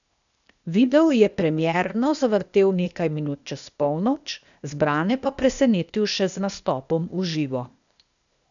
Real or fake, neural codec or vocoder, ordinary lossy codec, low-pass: fake; codec, 16 kHz, 0.8 kbps, ZipCodec; none; 7.2 kHz